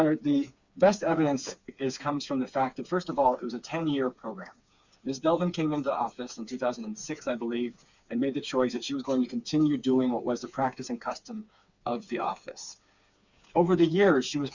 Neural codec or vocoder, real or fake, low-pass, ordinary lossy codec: codec, 16 kHz, 4 kbps, FreqCodec, smaller model; fake; 7.2 kHz; Opus, 64 kbps